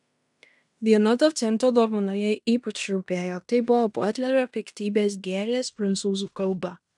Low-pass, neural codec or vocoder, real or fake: 10.8 kHz; codec, 16 kHz in and 24 kHz out, 0.9 kbps, LongCat-Audio-Codec, fine tuned four codebook decoder; fake